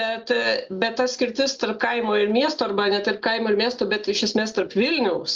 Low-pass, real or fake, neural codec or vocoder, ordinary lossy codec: 7.2 kHz; real; none; Opus, 32 kbps